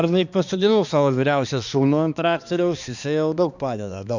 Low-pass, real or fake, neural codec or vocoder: 7.2 kHz; fake; codec, 16 kHz, 2 kbps, X-Codec, HuBERT features, trained on balanced general audio